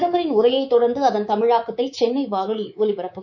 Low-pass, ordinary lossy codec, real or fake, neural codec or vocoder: 7.2 kHz; none; fake; codec, 24 kHz, 3.1 kbps, DualCodec